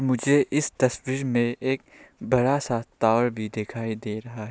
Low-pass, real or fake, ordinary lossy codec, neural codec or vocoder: none; real; none; none